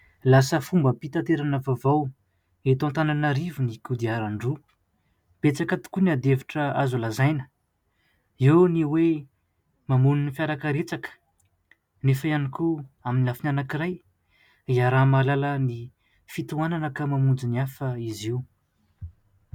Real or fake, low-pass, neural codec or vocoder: real; 19.8 kHz; none